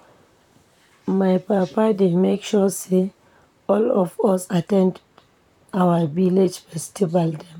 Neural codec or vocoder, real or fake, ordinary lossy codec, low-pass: vocoder, 44.1 kHz, 128 mel bands, Pupu-Vocoder; fake; none; 19.8 kHz